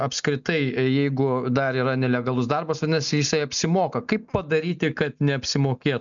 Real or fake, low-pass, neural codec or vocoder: real; 7.2 kHz; none